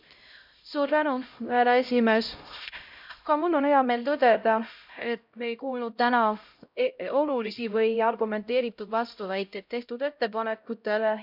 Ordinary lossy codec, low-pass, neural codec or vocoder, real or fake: none; 5.4 kHz; codec, 16 kHz, 0.5 kbps, X-Codec, HuBERT features, trained on LibriSpeech; fake